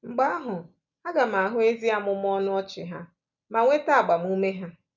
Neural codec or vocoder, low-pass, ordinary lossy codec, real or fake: none; 7.2 kHz; none; real